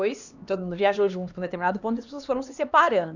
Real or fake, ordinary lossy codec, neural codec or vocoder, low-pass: fake; none; codec, 16 kHz, 2 kbps, X-Codec, HuBERT features, trained on LibriSpeech; 7.2 kHz